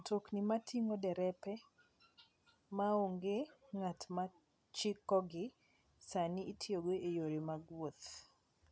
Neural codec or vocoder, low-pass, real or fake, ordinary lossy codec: none; none; real; none